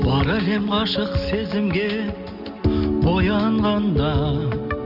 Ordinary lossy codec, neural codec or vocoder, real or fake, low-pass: none; none; real; 5.4 kHz